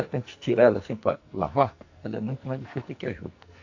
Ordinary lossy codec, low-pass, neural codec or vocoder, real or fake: AAC, 48 kbps; 7.2 kHz; codec, 44.1 kHz, 2.6 kbps, SNAC; fake